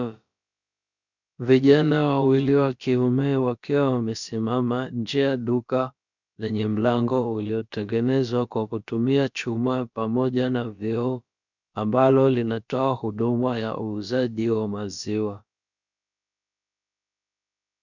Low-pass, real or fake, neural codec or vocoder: 7.2 kHz; fake; codec, 16 kHz, about 1 kbps, DyCAST, with the encoder's durations